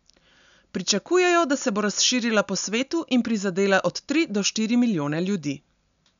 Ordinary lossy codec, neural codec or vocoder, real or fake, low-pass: none; none; real; 7.2 kHz